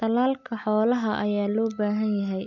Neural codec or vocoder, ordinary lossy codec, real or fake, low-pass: none; none; real; 7.2 kHz